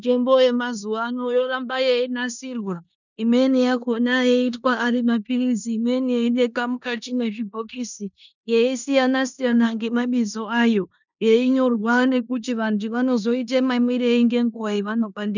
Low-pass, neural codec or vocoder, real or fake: 7.2 kHz; codec, 16 kHz in and 24 kHz out, 0.9 kbps, LongCat-Audio-Codec, four codebook decoder; fake